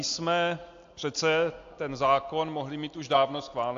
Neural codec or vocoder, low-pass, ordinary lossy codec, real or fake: none; 7.2 kHz; AAC, 48 kbps; real